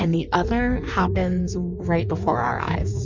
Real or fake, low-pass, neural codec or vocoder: fake; 7.2 kHz; codec, 16 kHz in and 24 kHz out, 1.1 kbps, FireRedTTS-2 codec